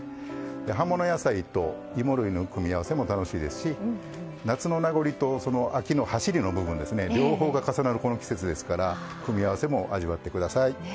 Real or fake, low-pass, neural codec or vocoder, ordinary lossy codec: real; none; none; none